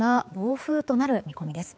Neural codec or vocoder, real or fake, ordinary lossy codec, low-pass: codec, 16 kHz, 4 kbps, X-Codec, HuBERT features, trained on balanced general audio; fake; none; none